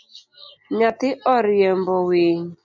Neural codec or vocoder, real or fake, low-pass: none; real; 7.2 kHz